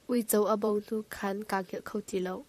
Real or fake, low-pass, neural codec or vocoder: fake; 14.4 kHz; vocoder, 44.1 kHz, 128 mel bands, Pupu-Vocoder